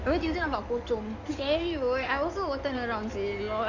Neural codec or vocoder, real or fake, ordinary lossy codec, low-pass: codec, 16 kHz in and 24 kHz out, 2.2 kbps, FireRedTTS-2 codec; fake; none; 7.2 kHz